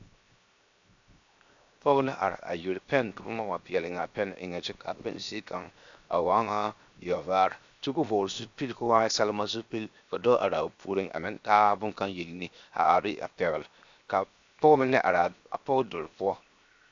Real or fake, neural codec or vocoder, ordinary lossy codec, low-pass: fake; codec, 16 kHz, 0.7 kbps, FocalCodec; AAC, 64 kbps; 7.2 kHz